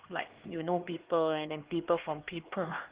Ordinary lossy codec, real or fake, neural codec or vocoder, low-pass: Opus, 16 kbps; fake; codec, 16 kHz, 2 kbps, X-Codec, HuBERT features, trained on LibriSpeech; 3.6 kHz